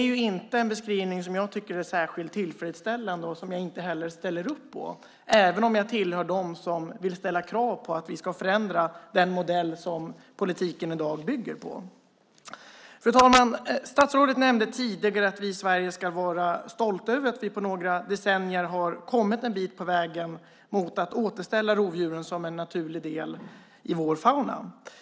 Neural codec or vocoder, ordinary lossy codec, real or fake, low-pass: none; none; real; none